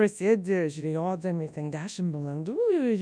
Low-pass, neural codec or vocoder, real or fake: 9.9 kHz; codec, 24 kHz, 0.9 kbps, WavTokenizer, large speech release; fake